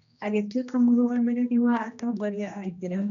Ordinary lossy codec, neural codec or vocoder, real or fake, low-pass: none; codec, 16 kHz, 1 kbps, X-Codec, HuBERT features, trained on general audio; fake; 7.2 kHz